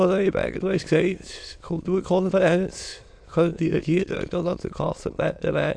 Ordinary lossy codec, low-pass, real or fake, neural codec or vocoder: AAC, 64 kbps; 9.9 kHz; fake; autoencoder, 22.05 kHz, a latent of 192 numbers a frame, VITS, trained on many speakers